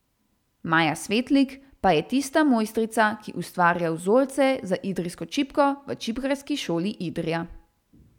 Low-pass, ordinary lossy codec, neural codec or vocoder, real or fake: 19.8 kHz; none; none; real